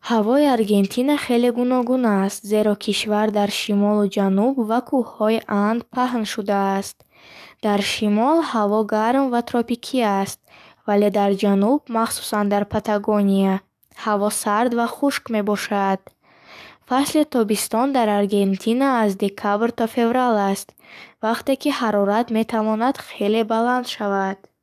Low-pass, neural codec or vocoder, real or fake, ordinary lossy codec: 14.4 kHz; codec, 44.1 kHz, 7.8 kbps, DAC; fake; none